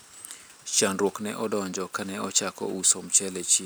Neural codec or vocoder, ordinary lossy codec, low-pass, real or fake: none; none; none; real